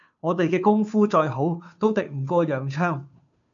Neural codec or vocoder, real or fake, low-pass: codec, 16 kHz, 2 kbps, FunCodec, trained on Chinese and English, 25 frames a second; fake; 7.2 kHz